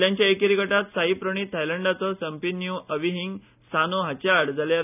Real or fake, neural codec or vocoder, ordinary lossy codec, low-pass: real; none; none; 3.6 kHz